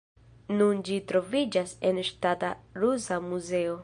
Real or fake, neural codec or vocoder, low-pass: real; none; 9.9 kHz